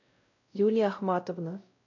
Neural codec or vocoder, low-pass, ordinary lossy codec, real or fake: codec, 16 kHz, 0.5 kbps, X-Codec, WavLM features, trained on Multilingual LibriSpeech; 7.2 kHz; AAC, 48 kbps; fake